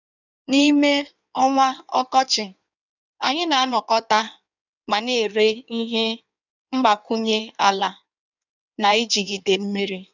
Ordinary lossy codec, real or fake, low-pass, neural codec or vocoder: none; fake; 7.2 kHz; codec, 16 kHz in and 24 kHz out, 1.1 kbps, FireRedTTS-2 codec